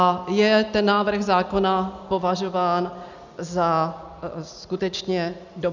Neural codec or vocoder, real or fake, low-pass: none; real; 7.2 kHz